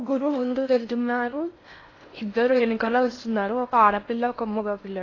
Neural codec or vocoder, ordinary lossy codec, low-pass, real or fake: codec, 16 kHz in and 24 kHz out, 0.6 kbps, FocalCodec, streaming, 4096 codes; AAC, 32 kbps; 7.2 kHz; fake